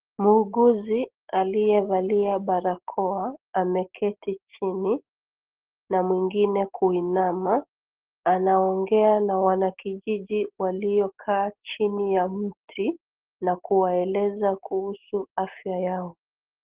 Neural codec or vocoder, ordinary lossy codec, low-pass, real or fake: none; Opus, 16 kbps; 3.6 kHz; real